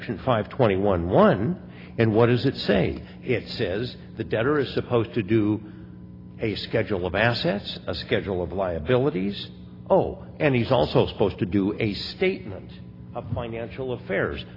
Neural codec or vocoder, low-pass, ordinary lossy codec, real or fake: none; 5.4 kHz; AAC, 24 kbps; real